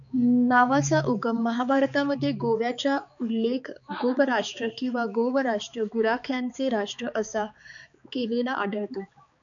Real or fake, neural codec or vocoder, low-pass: fake; codec, 16 kHz, 4 kbps, X-Codec, HuBERT features, trained on balanced general audio; 7.2 kHz